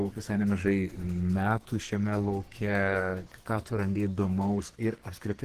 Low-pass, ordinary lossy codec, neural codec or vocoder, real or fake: 14.4 kHz; Opus, 16 kbps; codec, 44.1 kHz, 2.6 kbps, SNAC; fake